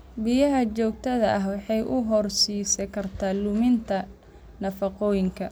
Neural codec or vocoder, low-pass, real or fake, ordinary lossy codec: none; none; real; none